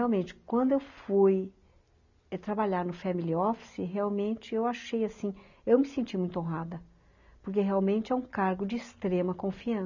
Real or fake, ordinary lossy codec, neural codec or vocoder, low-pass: real; none; none; 7.2 kHz